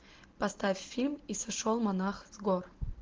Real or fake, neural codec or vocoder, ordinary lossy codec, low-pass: real; none; Opus, 16 kbps; 7.2 kHz